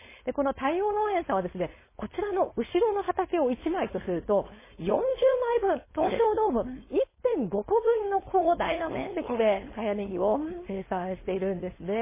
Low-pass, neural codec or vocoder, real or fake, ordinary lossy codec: 3.6 kHz; codec, 16 kHz, 4.8 kbps, FACodec; fake; MP3, 16 kbps